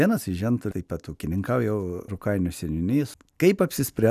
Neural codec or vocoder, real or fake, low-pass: none; real; 14.4 kHz